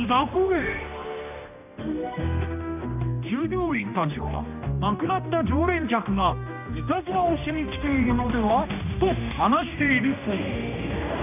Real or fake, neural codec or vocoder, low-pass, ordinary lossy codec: fake; codec, 16 kHz, 1 kbps, X-Codec, HuBERT features, trained on general audio; 3.6 kHz; none